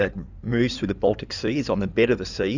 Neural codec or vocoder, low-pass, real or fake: codec, 16 kHz in and 24 kHz out, 2.2 kbps, FireRedTTS-2 codec; 7.2 kHz; fake